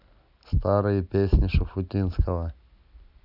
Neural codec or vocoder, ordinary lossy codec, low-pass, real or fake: none; none; 5.4 kHz; real